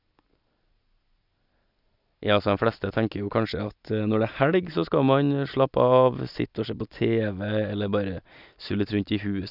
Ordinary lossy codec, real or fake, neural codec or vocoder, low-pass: none; real; none; 5.4 kHz